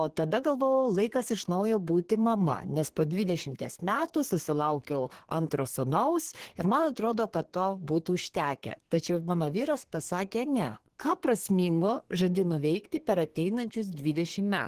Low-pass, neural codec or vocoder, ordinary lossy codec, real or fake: 14.4 kHz; codec, 32 kHz, 1.9 kbps, SNAC; Opus, 16 kbps; fake